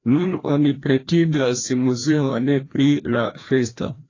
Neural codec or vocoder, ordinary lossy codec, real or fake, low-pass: codec, 16 kHz, 1 kbps, FreqCodec, larger model; AAC, 32 kbps; fake; 7.2 kHz